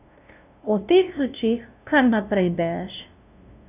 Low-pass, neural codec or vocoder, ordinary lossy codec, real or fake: 3.6 kHz; codec, 16 kHz, 0.5 kbps, FunCodec, trained on LibriTTS, 25 frames a second; none; fake